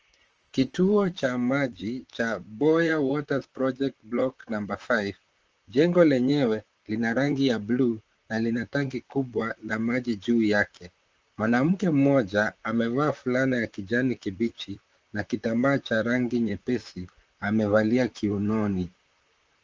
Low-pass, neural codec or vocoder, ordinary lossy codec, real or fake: 7.2 kHz; vocoder, 44.1 kHz, 128 mel bands, Pupu-Vocoder; Opus, 24 kbps; fake